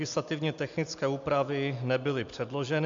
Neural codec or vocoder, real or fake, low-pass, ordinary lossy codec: none; real; 7.2 kHz; MP3, 64 kbps